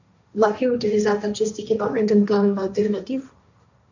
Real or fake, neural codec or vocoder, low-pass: fake; codec, 16 kHz, 1.1 kbps, Voila-Tokenizer; 7.2 kHz